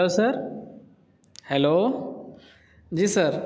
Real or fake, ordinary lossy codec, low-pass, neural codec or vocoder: real; none; none; none